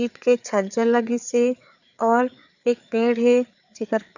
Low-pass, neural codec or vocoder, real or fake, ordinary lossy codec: 7.2 kHz; codec, 16 kHz, 4 kbps, FreqCodec, larger model; fake; none